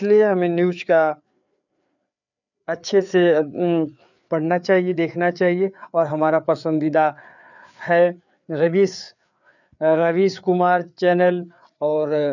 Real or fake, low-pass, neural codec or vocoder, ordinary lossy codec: fake; 7.2 kHz; codec, 16 kHz, 4 kbps, FreqCodec, larger model; none